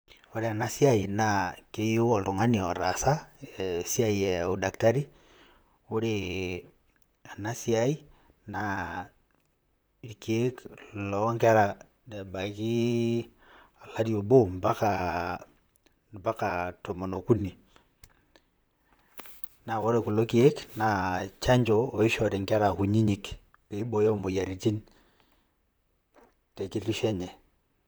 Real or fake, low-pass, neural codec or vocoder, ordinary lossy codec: fake; none; vocoder, 44.1 kHz, 128 mel bands, Pupu-Vocoder; none